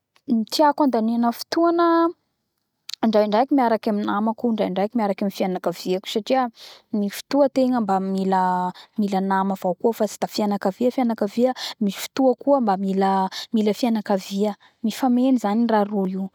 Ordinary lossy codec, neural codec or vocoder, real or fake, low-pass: none; none; real; 19.8 kHz